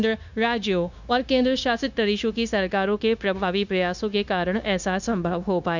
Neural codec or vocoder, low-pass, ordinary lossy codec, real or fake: codec, 16 kHz, 0.9 kbps, LongCat-Audio-Codec; 7.2 kHz; none; fake